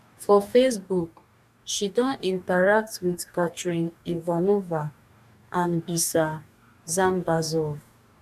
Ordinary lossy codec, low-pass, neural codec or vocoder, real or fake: none; 14.4 kHz; codec, 44.1 kHz, 2.6 kbps, DAC; fake